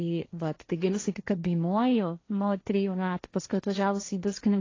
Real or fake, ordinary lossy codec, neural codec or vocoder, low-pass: fake; AAC, 32 kbps; codec, 16 kHz, 1.1 kbps, Voila-Tokenizer; 7.2 kHz